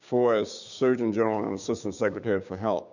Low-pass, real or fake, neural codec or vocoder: 7.2 kHz; fake; codec, 16 kHz, 6 kbps, DAC